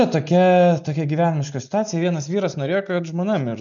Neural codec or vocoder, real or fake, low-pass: none; real; 7.2 kHz